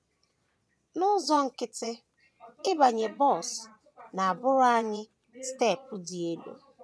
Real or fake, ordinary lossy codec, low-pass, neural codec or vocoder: fake; none; none; vocoder, 22.05 kHz, 80 mel bands, Vocos